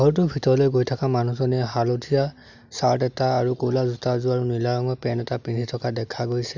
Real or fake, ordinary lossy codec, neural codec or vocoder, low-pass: real; none; none; 7.2 kHz